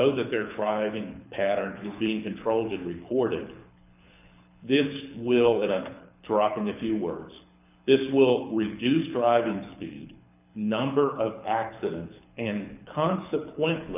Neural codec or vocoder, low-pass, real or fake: codec, 24 kHz, 6 kbps, HILCodec; 3.6 kHz; fake